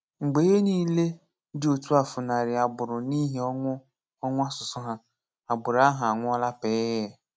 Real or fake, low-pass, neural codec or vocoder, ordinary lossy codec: real; none; none; none